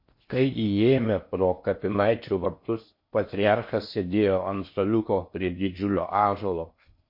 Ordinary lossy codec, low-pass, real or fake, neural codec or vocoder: MP3, 32 kbps; 5.4 kHz; fake; codec, 16 kHz in and 24 kHz out, 0.6 kbps, FocalCodec, streaming, 4096 codes